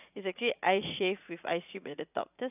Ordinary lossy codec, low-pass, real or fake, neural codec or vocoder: none; 3.6 kHz; fake; vocoder, 44.1 kHz, 80 mel bands, Vocos